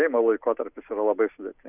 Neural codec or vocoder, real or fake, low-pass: none; real; 3.6 kHz